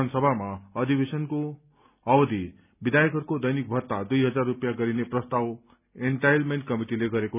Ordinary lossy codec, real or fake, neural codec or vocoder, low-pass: none; real; none; 3.6 kHz